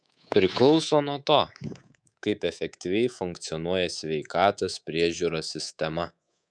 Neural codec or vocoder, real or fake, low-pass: codec, 24 kHz, 3.1 kbps, DualCodec; fake; 9.9 kHz